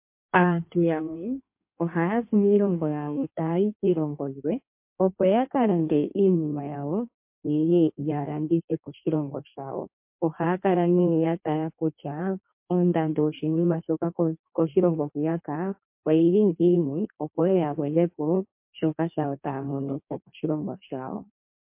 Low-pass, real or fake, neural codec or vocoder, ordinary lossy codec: 3.6 kHz; fake; codec, 16 kHz in and 24 kHz out, 1.1 kbps, FireRedTTS-2 codec; MP3, 32 kbps